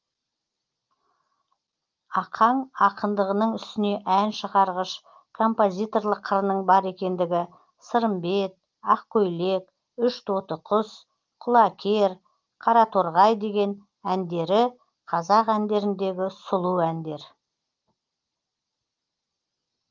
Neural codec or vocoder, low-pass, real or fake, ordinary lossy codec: none; 7.2 kHz; real; Opus, 24 kbps